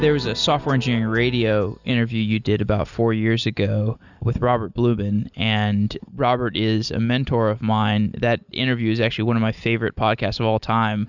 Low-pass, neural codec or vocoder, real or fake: 7.2 kHz; none; real